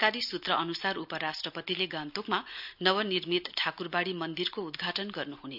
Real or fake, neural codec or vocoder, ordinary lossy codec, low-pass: real; none; none; 5.4 kHz